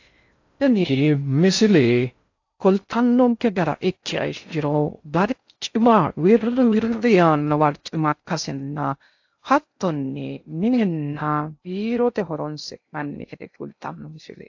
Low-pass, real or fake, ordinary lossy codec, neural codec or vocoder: 7.2 kHz; fake; AAC, 48 kbps; codec, 16 kHz in and 24 kHz out, 0.6 kbps, FocalCodec, streaming, 2048 codes